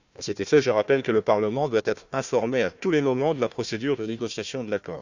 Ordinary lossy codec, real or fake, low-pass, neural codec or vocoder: none; fake; 7.2 kHz; codec, 16 kHz, 1 kbps, FunCodec, trained on Chinese and English, 50 frames a second